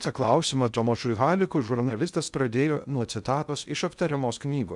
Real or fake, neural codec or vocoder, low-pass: fake; codec, 16 kHz in and 24 kHz out, 0.6 kbps, FocalCodec, streaming, 2048 codes; 10.8 kHz